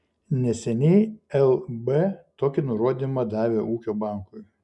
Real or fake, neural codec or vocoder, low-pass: real; none; 10.8 kHz